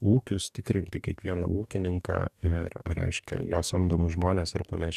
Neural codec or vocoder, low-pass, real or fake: codec, 44.1 kHz, 2.6 kbps, DAC; 14.4 kHz; fake